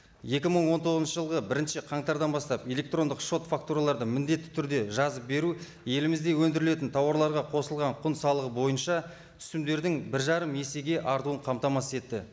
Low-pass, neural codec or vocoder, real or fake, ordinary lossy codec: none; none; real; none